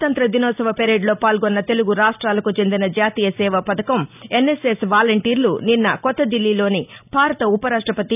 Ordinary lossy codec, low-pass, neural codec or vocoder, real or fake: none; 3.6 kHz; none; real